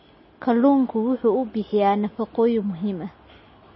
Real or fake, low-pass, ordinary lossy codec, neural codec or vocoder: real; 7.2 kHz; MP3, 24 kbps; none